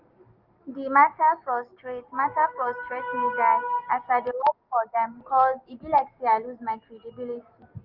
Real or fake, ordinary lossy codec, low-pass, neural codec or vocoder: real; Opus, 32 kbps; 5.4 kHz; none